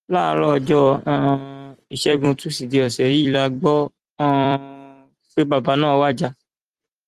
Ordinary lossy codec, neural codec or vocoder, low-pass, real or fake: Opus, 24 kbps; vocoder, 44.1 kHz, 128 mel bands every 256 samples, BigVGAN v2; 14.4 kHz; fake